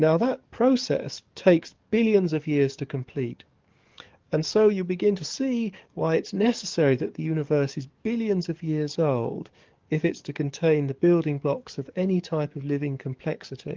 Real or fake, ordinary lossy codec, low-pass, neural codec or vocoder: fake; Opus, 32 kbps; 7.2 kHz; codec, 44.1 kHz, 7.8 kbps, DAC